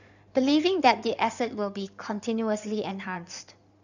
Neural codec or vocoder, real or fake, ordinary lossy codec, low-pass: codec, 16 kHz in and 24 kHz out, 2.2 kbps, FireRedTTS-2 codec; fake; none; 7.2 kHz